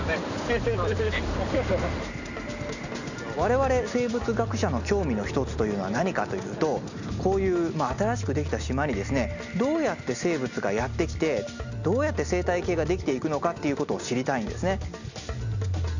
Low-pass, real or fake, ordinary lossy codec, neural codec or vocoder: 7.2 kHz; real; none; none